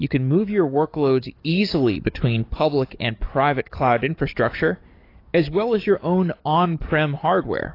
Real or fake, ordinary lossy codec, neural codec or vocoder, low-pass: fake; AAC, 32 kbps; codec, 44.1 kHz, 7.8 kbps, DAC; 5.4 kHz